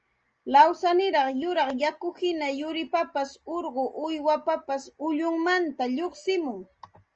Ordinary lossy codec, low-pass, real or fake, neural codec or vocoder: Opus, 24 kbps; 7.2 kHz; real; none